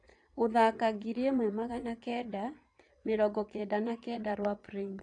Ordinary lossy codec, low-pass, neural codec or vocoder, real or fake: AAC, 48 kbps; 9.9 kHz; vocoder, 22.05 kHz, 80 mel bands, Vocos; fake